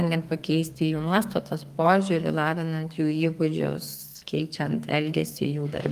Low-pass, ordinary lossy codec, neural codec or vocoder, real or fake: 14.4 kHz; Opus, 32 kbps; codec, 32 kHz, 1.9 kbps, SNAC; fake